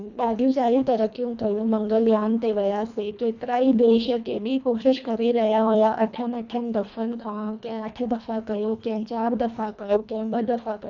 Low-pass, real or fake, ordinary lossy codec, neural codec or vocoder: 7.2 kHz; fake; none; codec, 24 kHz, 1.5 kbps, HILCodec